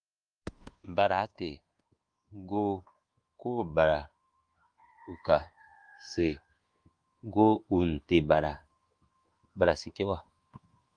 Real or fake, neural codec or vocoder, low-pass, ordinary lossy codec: fake; codec, 24 kHz, 1.2 kbps, DualCodec; 9.9 kHz; Opus, 24 kbps